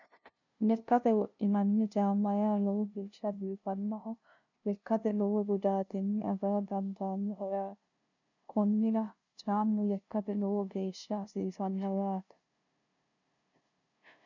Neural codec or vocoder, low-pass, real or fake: codec, 16 kHz, 0.5 kbps, FunCodec, trained on LibriTTS, 25 frames a second; 7.2 kHz; fake